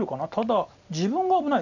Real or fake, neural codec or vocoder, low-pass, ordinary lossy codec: real; none; 7.2 kHz; none